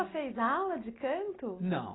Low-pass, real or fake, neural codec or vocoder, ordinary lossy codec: 7.2 kHz; real; none; AAC, 16 kbps